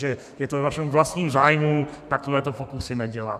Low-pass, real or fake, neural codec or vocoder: 14.4 kHz; fake; codec, 32 kHz, 1.9 kbps, SNAC